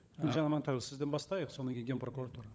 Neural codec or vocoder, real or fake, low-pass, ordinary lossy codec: codec, 16 kHz, 16 kbps, FunCodec, trained on LibriTTS, 50 frames a second; fake; none; none